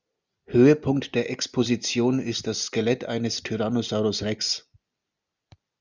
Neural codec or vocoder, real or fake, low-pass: none; real; 7.2 kHz